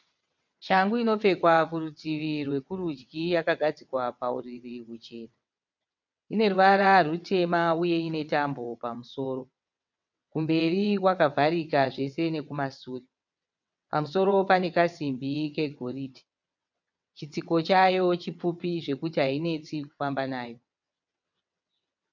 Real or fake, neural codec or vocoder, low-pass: fake; vocoder, 22.05 kHz, 80 mel bands, WaveNeXt; 7.2 kHz